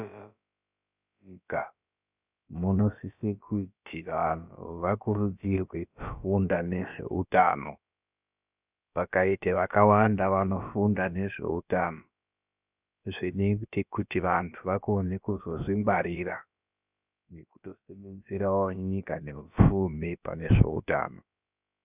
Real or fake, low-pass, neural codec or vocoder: fake; 3.6 kHz; codec, 16 kHz, about 1 kbps, DyCAST, with the encoder's durations